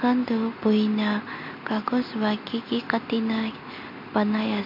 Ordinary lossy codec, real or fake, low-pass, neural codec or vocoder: MP3, 32 kbps; real; 5.4 kHz; none